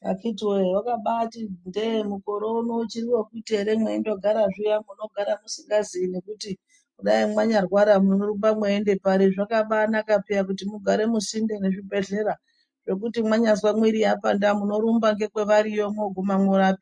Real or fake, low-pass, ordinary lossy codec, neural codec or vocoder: real; 9.9 kHz; MP3, 48 kbps; none